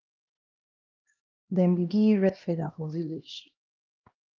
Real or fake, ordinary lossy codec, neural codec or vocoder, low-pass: fake; Opus, 32 kbps; codec, 16 kHz, 1 kbps, X-Codec, HuBERT features, trained on LibriSpeech; 7.2 kHz